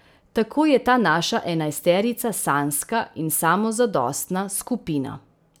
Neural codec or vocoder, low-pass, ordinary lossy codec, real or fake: none; none; none; real